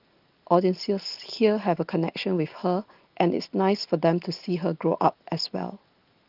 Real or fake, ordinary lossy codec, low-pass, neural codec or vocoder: real; Opus, 32 kbps; 5.4 kHz; none